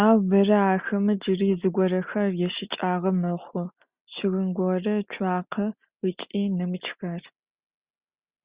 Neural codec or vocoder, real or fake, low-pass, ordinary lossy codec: none; real; 3.6 kHz; Opus, 64 kbps